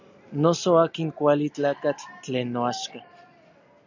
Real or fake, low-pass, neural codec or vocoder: real; 7.2 kHz; none